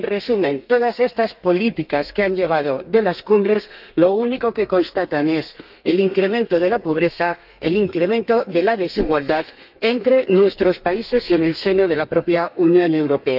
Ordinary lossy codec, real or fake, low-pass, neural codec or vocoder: none; fake; 5.4 kHz; codec, 32 kHz, 1.9 kbps, SNAC